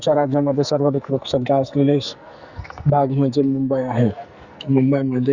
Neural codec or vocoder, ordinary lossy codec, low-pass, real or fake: codec, 44.1 kHz, 2.6 kbps, SNAC; Opus, 64 kbps; 7.2 kHz; fake